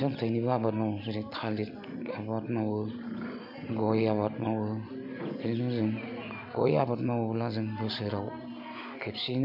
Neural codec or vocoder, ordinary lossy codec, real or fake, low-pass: none; MP3, 48 kbps; real; 5.4 kHz